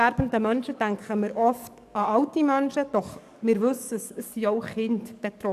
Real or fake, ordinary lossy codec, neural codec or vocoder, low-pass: fake; none; codec, 44.1 kHz, 7.8 kbps, DAC; 14.4 kHz